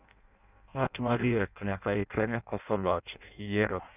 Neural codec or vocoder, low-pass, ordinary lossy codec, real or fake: codec, 16 kHz in and 24 kHz out, 0.6 kbps, FireRedTTS-2 codec; 3.6 kHz; none; fake